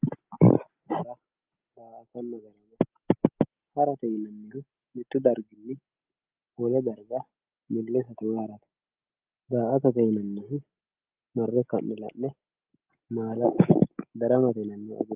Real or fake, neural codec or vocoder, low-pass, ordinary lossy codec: real; none; 3.6 kHz; Opus, 24 kbps